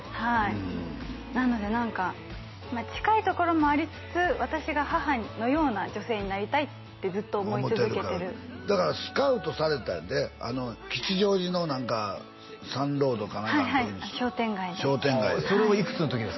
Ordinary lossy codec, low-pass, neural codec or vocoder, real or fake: MP3, 24 kbps; 7.2 kHz; none; real